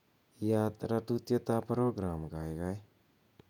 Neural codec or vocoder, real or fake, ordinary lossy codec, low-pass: vocoder, 48 kHz, 128 mel bands, Vocos; fake; none; 19.8 kHz